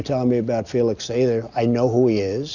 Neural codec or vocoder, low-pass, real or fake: none; 7.2 kHz; real